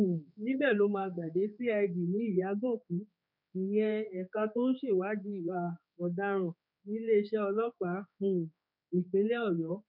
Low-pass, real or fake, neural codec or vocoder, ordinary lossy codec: 5.4 kHz; fake; codec, 16 kHz, 4 kbps, X-Codec, HuBERT features, trained on general audio; none